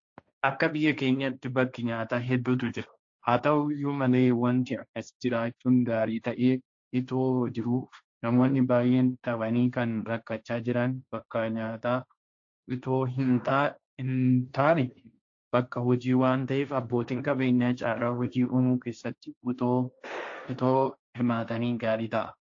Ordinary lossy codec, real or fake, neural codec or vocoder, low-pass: AAC, 48 kbps; fake; codec, 16 kHz, 1.1 kbps, Voila-Tokenizer; 7.2 kHz